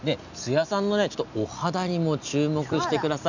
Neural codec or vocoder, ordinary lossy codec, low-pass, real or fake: none; none; 7.2 kHz; real